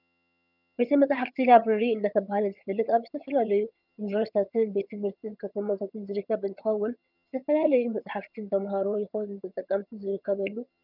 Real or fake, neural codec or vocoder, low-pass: fake; vocoder, 22.05 kHz, 80 mel bands, HiFi-GAN; 5.4 kHz